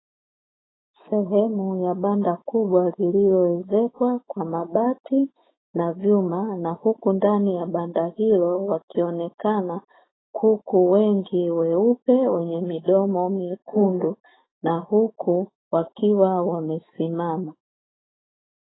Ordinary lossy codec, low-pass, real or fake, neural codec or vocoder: AAC, 16 kbps; 7.2 kHz; fake; autoencoder, 48 kHz, 128 numbers a frame, DAC-VAE, trained on Japanese speech